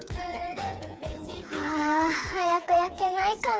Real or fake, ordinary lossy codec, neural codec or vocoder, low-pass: fake; none; codec, 16 kHz, 4 kbps, FreqCodec, smaller model; none